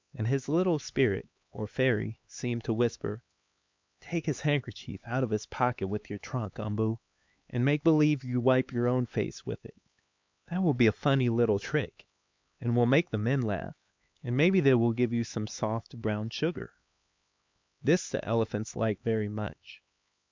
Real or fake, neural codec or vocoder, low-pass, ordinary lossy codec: fake; codec, 16 kHz, 4 kbps, X-Codec, HuBERT features, trained on LibriSpeech; 7.2 kHz; MP3, 64 kbps